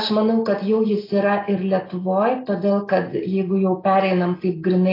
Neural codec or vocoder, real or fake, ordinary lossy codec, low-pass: none; real; AAC, 24 kbps; 5.4 kHz